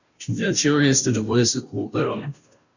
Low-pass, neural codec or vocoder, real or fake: 7.2 kHz; codec, 16 kHz, 0.5 kbps, FunCodec, trained on Chinese and English, 25 frames a second; fake